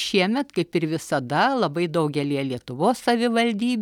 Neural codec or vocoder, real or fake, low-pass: none; real; 19.8 kHz